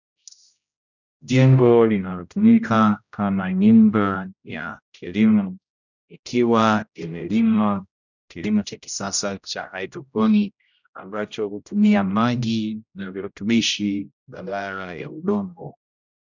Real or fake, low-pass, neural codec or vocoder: fake; 7.2 kHz; codec, 16 kHz, 0.5 kbps, X-Codec, HuBERT features, trained on general audio